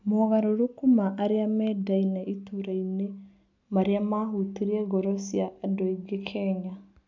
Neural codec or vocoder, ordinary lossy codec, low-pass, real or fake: none; MP3, 64 kbps; 7.2 kHz; real